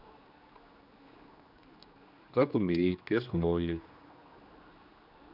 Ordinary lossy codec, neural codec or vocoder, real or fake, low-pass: none; codec, 16 kHz, 2 kbps, X-Codec, HuBERT features, trained on general audio; fake; 5.4 kHz